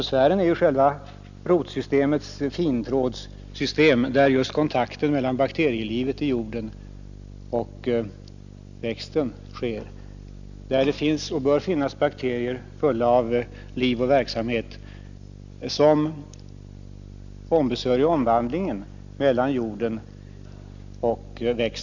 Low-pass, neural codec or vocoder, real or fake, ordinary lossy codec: 7.2 kHz; none; real; none